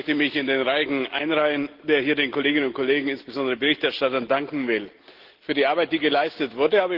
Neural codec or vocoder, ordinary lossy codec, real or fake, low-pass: none; Opus, 16 kbps; real; 5.4 kHz